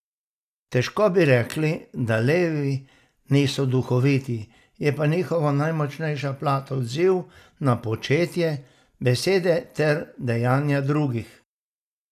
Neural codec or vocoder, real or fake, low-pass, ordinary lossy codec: none; real; 14.4 kHz; none